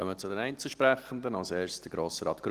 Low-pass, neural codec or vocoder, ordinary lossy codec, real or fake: 14.4 kHz; none; Opus, 24 kbps; real